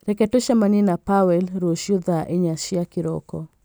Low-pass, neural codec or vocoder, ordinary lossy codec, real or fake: none; none; none; real